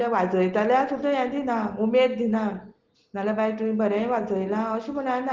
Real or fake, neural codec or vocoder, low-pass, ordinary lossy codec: real; none; 7.2 kHz; Opus, 16 kbps